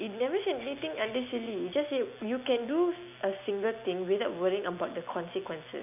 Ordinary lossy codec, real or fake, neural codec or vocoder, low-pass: none; real; none; 3.6 kHz